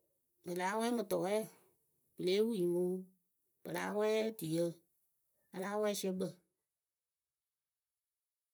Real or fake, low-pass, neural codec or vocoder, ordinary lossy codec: fake; none; vocoder, 44.1 kHz, 128 mel bands, Pupu-Vocoder; none